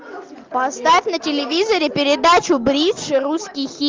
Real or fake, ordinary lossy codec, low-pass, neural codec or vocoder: real; Opus, 24 kbps; 7.2 kHz; none